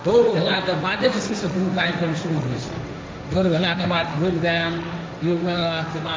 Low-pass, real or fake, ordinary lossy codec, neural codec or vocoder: none; fake; none; codec, 16 kHz, 1.1 kbps, Voila-Tokenizer